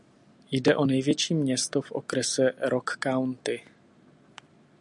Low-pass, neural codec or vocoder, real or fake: 10.8 kHz; none; real